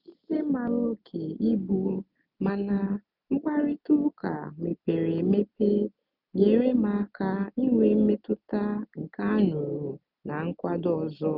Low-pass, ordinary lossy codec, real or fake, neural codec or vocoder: 5.4 kHz; none; real; none